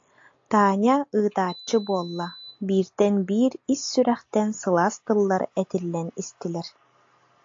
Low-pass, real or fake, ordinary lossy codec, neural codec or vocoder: 7.2 kHz; real; AAC, 48 kbps; none